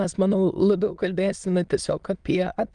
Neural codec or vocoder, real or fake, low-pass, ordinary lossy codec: autoencoder, 22.05 kHz, a latent of 192 numbers a frame, VITS, trained on many speakers; fake; 9.9 kHz; Opus, 32 kbps